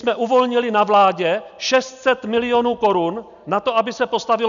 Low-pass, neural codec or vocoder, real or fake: 7.2 kHz; none; real